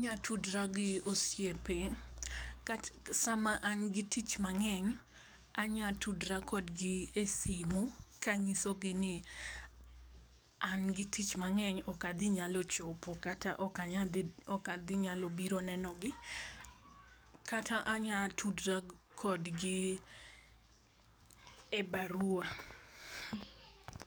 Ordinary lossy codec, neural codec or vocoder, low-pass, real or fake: none; codec, 44.1 kHz, 7.8 kbps, DAC; none; fake